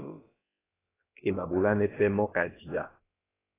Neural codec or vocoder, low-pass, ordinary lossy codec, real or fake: codec, 16 kHz, about 1 kbps, DyCAST, with the encoder's durations; 3.6 kHz; AAC, 16 kbps; fake